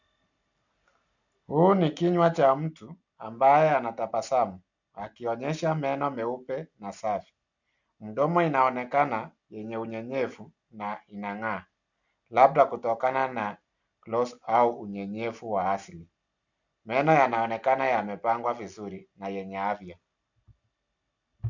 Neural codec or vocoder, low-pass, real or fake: none; 7.2 kHz; real